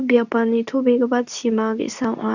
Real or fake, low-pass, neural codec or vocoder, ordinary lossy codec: fake; 7.2 kHz; codec, 24 kHz, 0.9 kbps, WavTokenizer, medium speech release version 2; none